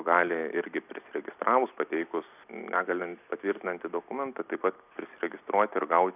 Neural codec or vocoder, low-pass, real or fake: none; 3.6 kHz; real